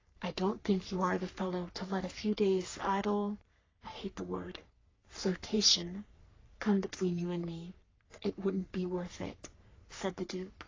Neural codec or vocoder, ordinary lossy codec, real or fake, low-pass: codec, 44.1 kHz, 3.4 kbps, Pupu-Codec; AAC, 32 kbps; fake; 7.2 kHz